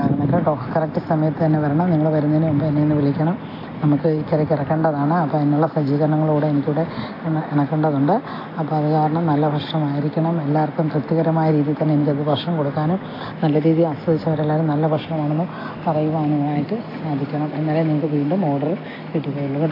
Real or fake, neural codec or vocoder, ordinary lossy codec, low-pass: real; none; AAC, 24 kbps; 5.4 kHz